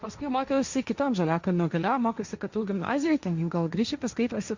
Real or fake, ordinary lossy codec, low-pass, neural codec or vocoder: fake; Opus, 64 kbps; 7.2 kHz; codec, 16 kHz, 1.1 kbps, Voila-Tokenizer